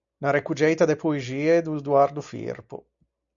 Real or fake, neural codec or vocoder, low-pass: real; none; 7.2 kHz